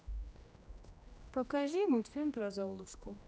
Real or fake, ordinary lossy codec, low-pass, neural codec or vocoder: fake; none; none; codec, 16 kHz, 1 kbps, X-Codec, HuBERT features, trained on general audio